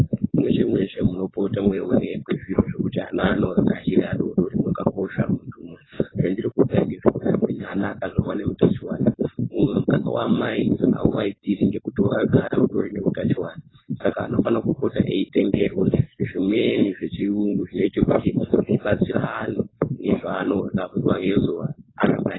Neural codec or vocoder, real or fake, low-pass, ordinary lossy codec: codec, 16 kHz, 4.8 kbps, FACodec; fake; 7.2 kHz; AAC, 16 kbps